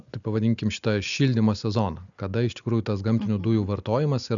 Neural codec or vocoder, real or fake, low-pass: none; real; 7.2 kHz